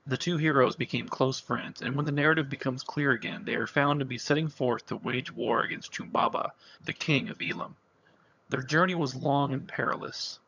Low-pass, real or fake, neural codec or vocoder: 7.2 kHz; fake; vocoder, 22.05 kHz, 80 mel bands, HiFi-GAN